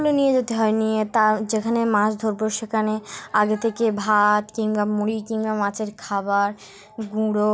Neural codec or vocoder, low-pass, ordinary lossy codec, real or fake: none; none; none; real